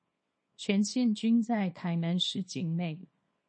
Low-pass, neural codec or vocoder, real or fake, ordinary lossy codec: 9.9 kHz; codec, 24 kHz, 0.9 kbps, WavTokenizer, small release; fake; MP3, 32 kbps